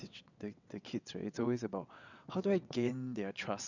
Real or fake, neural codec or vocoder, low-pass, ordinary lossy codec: fake; vocoder, 22.05 kHz, 80 mel bands, WaveNeXt; 7.2 kHz; none